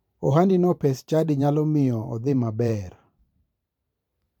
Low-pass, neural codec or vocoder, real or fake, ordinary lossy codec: 19.8 kHz; vocoder, 44.1 kHz, 128 mel bands every 512 samples, BigVGAN v2; fake; none